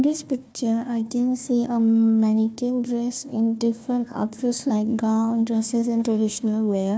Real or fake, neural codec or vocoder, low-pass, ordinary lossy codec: fake; codec, 16 kHz, 1 kbps, FunCodec, trained on Chinese and English, 50 frames a second; none; none